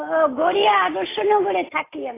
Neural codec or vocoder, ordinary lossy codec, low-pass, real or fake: none; AAC, 16 kbps; 3.6 kHz; real